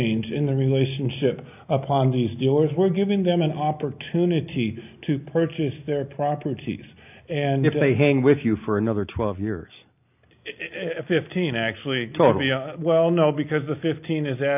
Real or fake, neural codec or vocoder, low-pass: real; none; 3.6 kHz